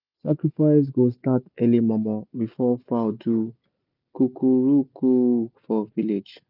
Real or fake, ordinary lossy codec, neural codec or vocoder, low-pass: real; none; none; 5.4 kHz